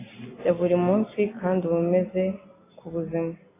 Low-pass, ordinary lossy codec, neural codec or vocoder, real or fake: 3.6 kHz; AAC, 16 kbps; none; real